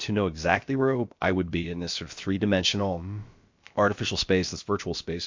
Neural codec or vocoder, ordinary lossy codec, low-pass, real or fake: codec, 16 kHz, about 1 kbps, DyCAST, with the encoder's durations; MP3, 48 kbps; 7.2 kHz; fake